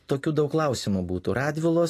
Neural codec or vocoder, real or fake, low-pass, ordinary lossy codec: none; real; 14.4 kHz; AAC, 48 kbps